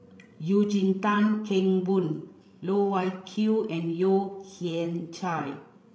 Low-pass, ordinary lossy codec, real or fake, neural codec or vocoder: none; none; fake; codec, 16 kHz, 16 kbps, FreqCodec, larger model